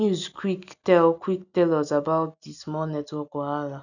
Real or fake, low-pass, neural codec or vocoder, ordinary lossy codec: real; 7.2 kHz; none; none